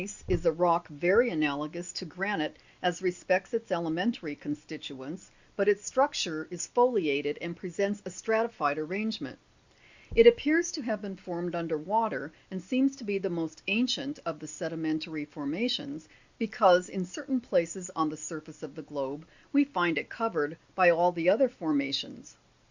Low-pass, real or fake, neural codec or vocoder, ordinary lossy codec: 7.2 kHz; real; none; Opus, 64 kbps